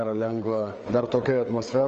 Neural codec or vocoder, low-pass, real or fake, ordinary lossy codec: codec, 16 kHz, 4 kbps, FreqCodec, larger model; 7.2 kHz; fake; Opus, 24 kbps